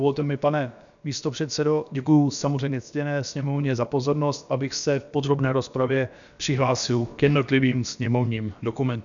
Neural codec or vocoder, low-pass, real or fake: codec, 16 kHz, about 1 kbps, DyCAST, with the encoder's durations; 7.2 kHz; fake